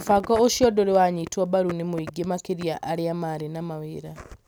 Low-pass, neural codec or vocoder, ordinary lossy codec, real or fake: none; none; none; real